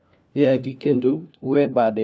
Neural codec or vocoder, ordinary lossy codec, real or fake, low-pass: codec, 16 kHz, 1 kbps, FunCodec, trained on LibriTTS, 50 frames a second; none; fake; none